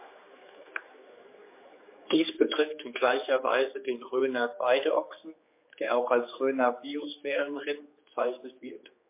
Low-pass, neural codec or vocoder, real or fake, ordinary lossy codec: 3.6 kHz; codec, 16 kHz, 4 kbps, X-Codec, HuBERT features, trained on general audio; fake; MP3, 24 kbps